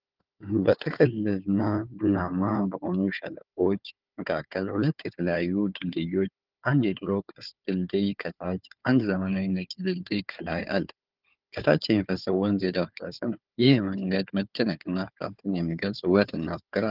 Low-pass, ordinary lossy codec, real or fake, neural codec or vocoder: 5.4 kHz; Opus, 24 kbps; fake; codec, 16 kHz, 4 kbps, FunCodec, trained on Chinese and English, 50 frames a second